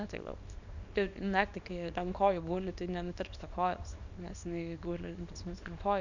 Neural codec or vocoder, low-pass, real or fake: codec, 24 kHz, 0.9 kbps, WavTokenizer, small release; 7.2 kHz; fake